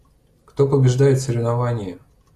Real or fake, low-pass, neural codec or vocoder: real; 14.4 kHz; none